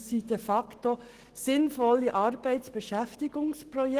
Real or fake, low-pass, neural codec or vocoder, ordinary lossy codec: fake; 14.4 kHz; autoencoder, 48 kHz, 128 numbers a frame, DAC-VAE, trained on Japanese speech; Opus, 16 kbps